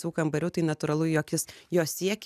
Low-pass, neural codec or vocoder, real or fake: 14.4 kHz; none; real